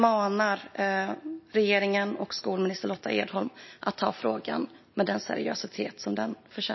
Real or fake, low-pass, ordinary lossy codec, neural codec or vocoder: real; 7.2 kHz; MP3, 24 kbps; none